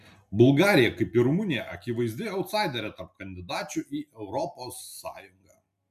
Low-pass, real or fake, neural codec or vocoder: 14.4 kHz; real; none